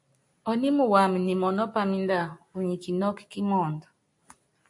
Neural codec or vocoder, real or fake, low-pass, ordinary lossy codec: none; real; 10.8 kHz; AAC, 64 kbps